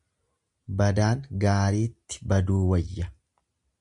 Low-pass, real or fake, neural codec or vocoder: 10.8 kHz; real; none